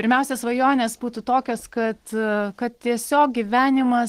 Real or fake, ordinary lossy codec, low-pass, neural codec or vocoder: real; Opus, 16 kbps; 14.4 kHz; none